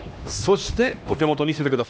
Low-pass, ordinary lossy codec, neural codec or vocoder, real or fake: none; none; codec, 16 kHz, 1 kbps, X-Codec, HuBERT features, trained on LibriSpeech; fake